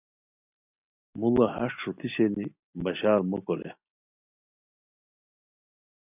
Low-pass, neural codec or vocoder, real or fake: 3.6 kHz; none; real